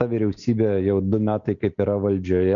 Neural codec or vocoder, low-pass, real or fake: none; 7.2 kHz; real